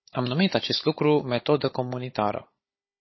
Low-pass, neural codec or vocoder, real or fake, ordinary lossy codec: 7.2 kHz; codec, 16 kHz, 16 kbps, FunCodec, trained on Chinese and English, 50 frames a second; fake; MP3, 24 kbps